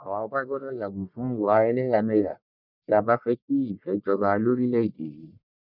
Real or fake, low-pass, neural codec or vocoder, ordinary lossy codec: fake; 5.4 kHz; codec, 44.1 kHz, 1.7 kbps, Pupu-Codec; none